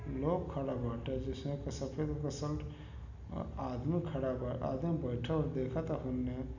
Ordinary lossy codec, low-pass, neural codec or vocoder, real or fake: none; 7.2 kHz; none; real